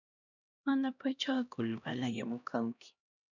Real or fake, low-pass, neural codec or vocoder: fake; 7.2 kHz; codec, 16 kHz, 1 kbps, X-Codec, HuBERT features, trained on LibriSpeech